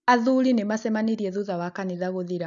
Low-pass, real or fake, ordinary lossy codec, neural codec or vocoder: 7.2 kHz; real; none; none